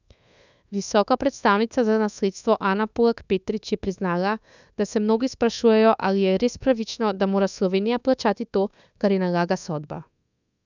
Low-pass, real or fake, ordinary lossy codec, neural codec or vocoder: 7.2 kHz; fake; none; codec, 24 kHz, 1.2 kbps, DualCodec